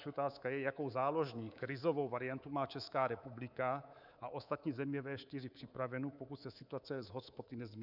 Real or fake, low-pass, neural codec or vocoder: fake; 5.4 kHz; codec, 24 kHz, 3.1 kbps, DualCodec